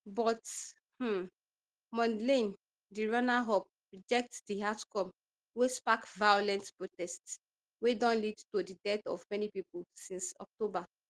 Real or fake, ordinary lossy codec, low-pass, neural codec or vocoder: real; Opus, 16 kbps; 10.8 kHz; none